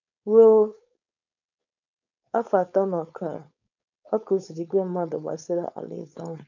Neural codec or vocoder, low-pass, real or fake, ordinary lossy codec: codec, 16 kHz, 4.8 kbps, FACodec; 7.2 kHz; fake; none